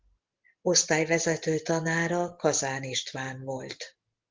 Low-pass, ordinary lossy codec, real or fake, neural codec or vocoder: 7.2 kHz; Opus, 16 kbps; real; none